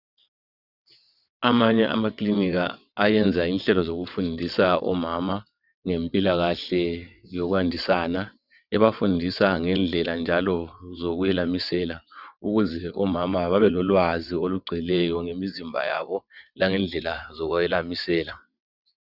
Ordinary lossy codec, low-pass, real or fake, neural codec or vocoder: Opus, 64 kbps; 5.4 kHz; fake; vocoder, 22.05 kHz, 80 mel bands, WaveNeXt